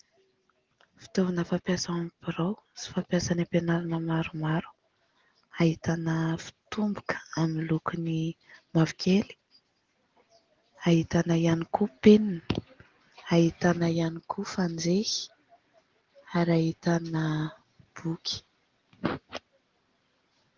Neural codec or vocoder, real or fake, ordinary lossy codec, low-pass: none; real; Opus, 16 kbps; 7.2 kHz